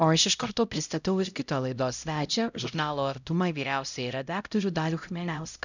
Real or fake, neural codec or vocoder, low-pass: fake; codec, 16 kHz, 0.5 kbps, X-Codec, HuBERT features, trained on LibriSpeech; 7.2 kHz